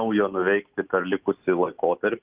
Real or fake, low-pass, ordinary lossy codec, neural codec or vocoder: real; 3.6 kHz; Opus, 24 kbps; none